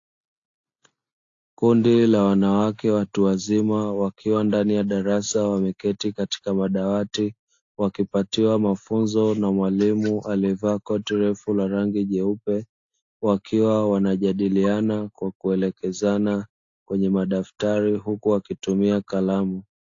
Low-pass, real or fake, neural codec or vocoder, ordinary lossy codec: 7.2 kHz; real; none; AAC, 48 kbps